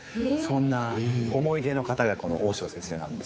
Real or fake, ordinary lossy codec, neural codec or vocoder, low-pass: fake; none; codec, 16 kHz, 4 kbps, X-Codec, HuBERT features, trained on general audio; none